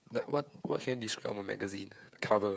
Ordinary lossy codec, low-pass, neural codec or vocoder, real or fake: none; none; codec, 16 kHz, 8 kbps, FreqCodec, smaller model; fake